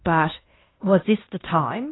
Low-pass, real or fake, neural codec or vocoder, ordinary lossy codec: 7.2 kHz; fake; codec, 16 kHz in and 24 kHz out, 0.9 kbps, LongCat-Audio-Codec, fine tuned four codebook decoder; AAC, 16 kbps